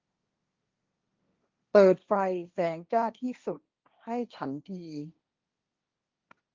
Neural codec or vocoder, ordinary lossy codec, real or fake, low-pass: codec, 16 kHz, 1.1 kbps, Voila-Tokenizer; Opus, 32 kbps; fake; 7.2 kHz